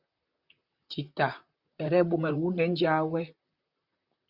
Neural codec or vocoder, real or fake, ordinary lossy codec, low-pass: vocoder, 44.1 kHz, 128 mel bands, Pupu-Vocoder; fake; Opus, 64 kbps; 5.4 kHz